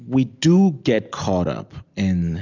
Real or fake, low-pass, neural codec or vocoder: real; 7.2 kHz; none